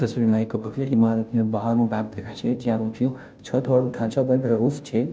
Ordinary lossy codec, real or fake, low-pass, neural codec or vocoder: none; fake; none; codec, 16 kHz, 0.5 kbps, FunCodec, trained on Chinese and English, 25 frames a second